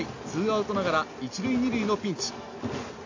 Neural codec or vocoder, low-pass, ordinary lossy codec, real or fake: none; 7.2 kHz; AAC, 48 kbps; real